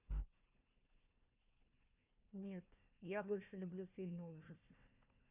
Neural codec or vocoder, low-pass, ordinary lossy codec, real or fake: codec, 16 kHz, 1 kbps, FunCodec, trained on Chinese and English, 50 frames a second; 3.6 kHz; none; fake